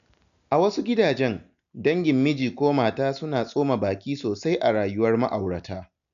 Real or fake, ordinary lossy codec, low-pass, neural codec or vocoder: real; none; 7.2 kHz; none